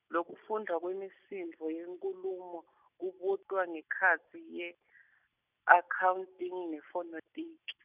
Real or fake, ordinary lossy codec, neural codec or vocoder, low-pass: real; none; none; 3.6 kHz